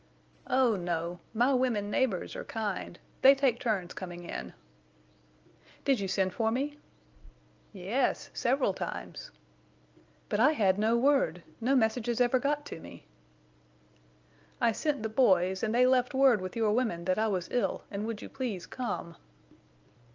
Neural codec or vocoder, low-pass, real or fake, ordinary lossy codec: none; 7.2 kHz; real; Opus, 24 kbps